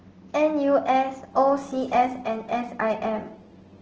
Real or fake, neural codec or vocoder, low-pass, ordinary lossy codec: real; none; 7.2 kHz; Opus, 16 kbps